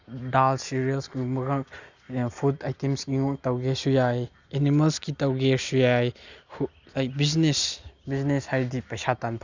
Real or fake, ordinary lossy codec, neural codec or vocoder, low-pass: real; Opus, 64 kbps; none; 7.2 kHz